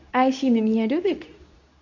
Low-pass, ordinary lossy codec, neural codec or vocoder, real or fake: 7.2 kHz; none; codec, 24 kHz, 0.9 kbps, WavTokenizer, medium speech release version 2; fake